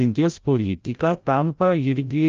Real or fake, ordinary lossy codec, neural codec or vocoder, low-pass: fake; Opus, 24 kbps; codec, 16 kHz, 0.5 kbps, FreqCodec, larger model; 7.2 kHz